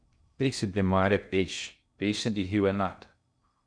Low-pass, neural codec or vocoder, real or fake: 9.9 kHz; codec, 16 kHz in and 24 kHz out, 0.6 kbps, FocalCodec, streaming, 2048 codes; fake